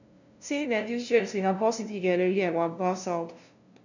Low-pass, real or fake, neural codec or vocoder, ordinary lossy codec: 7.2 kHz; fake; codec, 16 kHz, 0.5 kbps, FunCodec, trained on LibriTTS, 25 frames a second; none